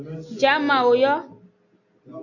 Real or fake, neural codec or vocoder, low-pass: real; none; 7.2 kHz